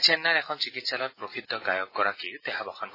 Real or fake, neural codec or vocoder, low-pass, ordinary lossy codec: real; none; 5.4 kHz; AAC, 24 kbps